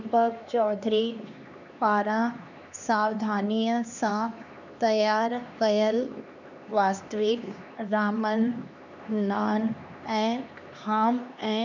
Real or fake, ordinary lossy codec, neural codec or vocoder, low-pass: fake; none; codec, 16 kHz, 2 kbps, X-Codec, HuBERT features, trained on LibriSpeech; 7.2 kHz